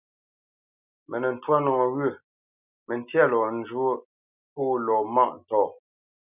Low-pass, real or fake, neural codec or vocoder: 3.6 kHz; real; none